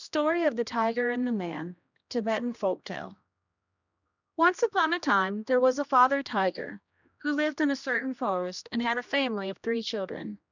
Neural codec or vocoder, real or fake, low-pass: codec, 16 kHz, 1 kbps, X-Codec, HuBERT features, trained on general audio; fake; 7.2 kHz